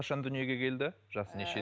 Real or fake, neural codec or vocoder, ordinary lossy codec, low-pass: real; none; none; none